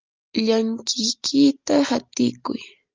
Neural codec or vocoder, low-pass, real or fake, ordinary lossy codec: none; 7.2 kHz; real; Opus, 32 kbps